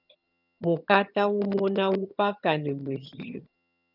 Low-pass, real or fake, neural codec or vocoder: 5.4 kHz; fake; vocoder, 22.05 kHz, 80 mel bands, HiFi-GAN